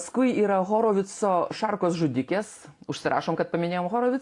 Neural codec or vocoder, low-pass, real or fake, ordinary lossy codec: none; 10.8 kHz; real; AAC, 48 kbps